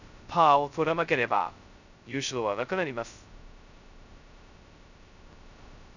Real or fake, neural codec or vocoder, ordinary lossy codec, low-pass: fake; codec, 16 kHz, 0.2 kbps, FocalCodec; none; 7.2 kHz